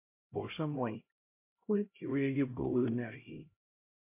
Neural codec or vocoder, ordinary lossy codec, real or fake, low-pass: codec, 16 kHz, 0.5 kbps, X-Codec, HuBERT features, trained on LibriSpeech; MP3, 24 kbps; fake; 3.6 kHz